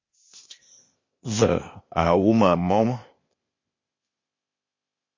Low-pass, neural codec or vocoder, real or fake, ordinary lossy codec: 7.2 kHz; codec, 16 kHz, 0.8 kbps, ZipCodec; fake; MP3, 32 kbps